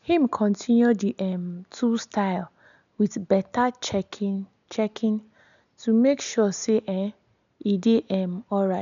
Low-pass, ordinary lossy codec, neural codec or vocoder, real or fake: 7.2 kHz; none; none; real